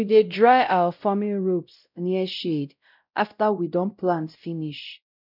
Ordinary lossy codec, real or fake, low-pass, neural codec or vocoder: none; fake; 5.4 kHz; codec, 16 kHz, 0.5 kbps, X-Codec, WavLM features, trained on Multilingual LibriSpeech